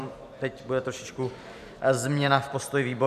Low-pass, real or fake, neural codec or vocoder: 14.4 kHz; fake; vocoder, 44.1 kHz, 128 mel bands every 512 samples, BigVGAN v2